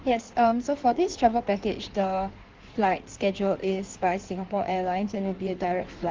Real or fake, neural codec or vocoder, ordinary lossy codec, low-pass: fake; codec, 16 kHz in and 24 kHz out, 1.1 kbps, FireRedTTS-2 codec; Opus, 16 kbps; 7.2 kHz